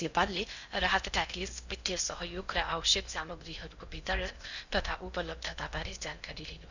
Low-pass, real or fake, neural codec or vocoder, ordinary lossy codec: 7.2 kHz; fake; codec, 16 kHz in and 24 kHz out, 0.6 kbps, FocalCodec, streaming, 4096 codes; none